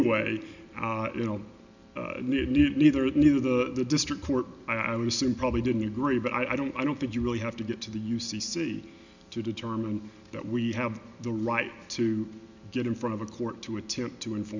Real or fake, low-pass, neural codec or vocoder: real; 7.2 kHz; none